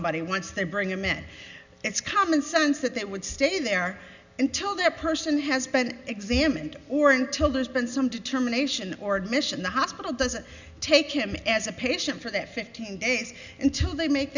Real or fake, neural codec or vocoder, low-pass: real; none; 7.2 kHz